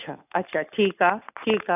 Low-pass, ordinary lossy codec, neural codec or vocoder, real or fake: 3.6 kHz; none; none; real